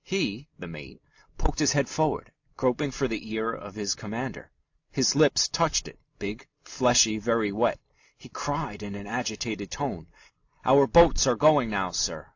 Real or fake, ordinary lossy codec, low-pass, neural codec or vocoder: real; AAC, 48 kbps; 7.2 kHz; none